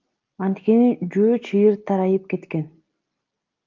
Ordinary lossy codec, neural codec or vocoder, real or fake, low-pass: Opus, 24 kbps; none; real; 7.2 kHz